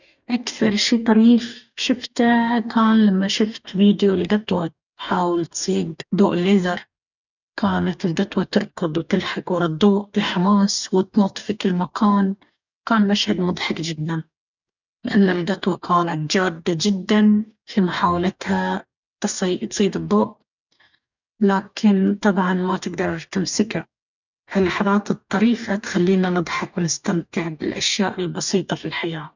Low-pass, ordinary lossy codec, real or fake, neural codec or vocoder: 7.2 kHz; none; fake; codec, 44.1 kHz, 2.6 kbps, DAC